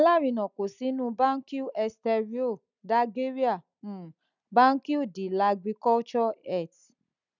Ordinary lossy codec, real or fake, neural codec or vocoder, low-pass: none; real; none; 7.2 kHz